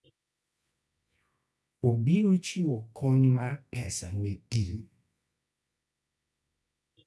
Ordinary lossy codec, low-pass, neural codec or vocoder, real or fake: none; none; codec, 24 kHz, 0.9 kbps, WavTokenizer, medium music audio release; fake